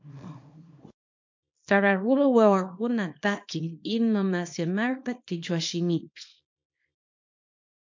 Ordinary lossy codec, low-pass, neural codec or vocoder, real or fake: MP3, 48 kbps; 7.2 kHz; codec, 24 kHz, 0.9 kbps, WavTokenizer, small release; fake